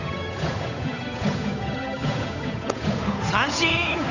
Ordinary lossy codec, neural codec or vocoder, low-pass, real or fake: none; codec, 16 kHz, 8 kbps, FunCodec, trained on Chinese and English, 25 frames a second; 7.2 kHz; fake